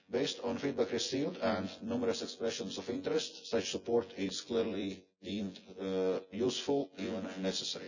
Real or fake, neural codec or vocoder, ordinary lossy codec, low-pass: fake; vocoder, 24 kHz, 100 mel bands, Vocos; none; 7.2 kHz